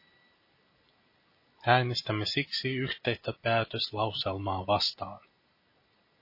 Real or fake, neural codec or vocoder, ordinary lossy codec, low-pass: real; none; MP3, 24 kbps; 5.4 kHz